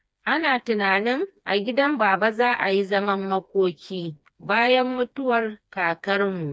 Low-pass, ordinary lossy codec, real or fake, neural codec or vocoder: none; none; fake; codec, 16 kHz, 2 kbps, FreqCodec, smaller model